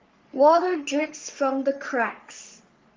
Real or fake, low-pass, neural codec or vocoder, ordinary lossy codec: fake; 7.2 kHz; codec, 44.1 kHz, 3.4 kbps, Pupu-Codec; Opus, 32 kbps